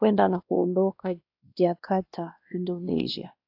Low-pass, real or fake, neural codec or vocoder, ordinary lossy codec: 5.4 kHz; fake; codec, 16 kHz, 1 kbps, X-Codec, WavLM features, trained on Multilingual LibriSpeech; AAC, 48 kbps